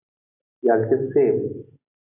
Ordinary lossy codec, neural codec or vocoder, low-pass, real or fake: AAC, 32 kbps; none; 3.6 kHz; real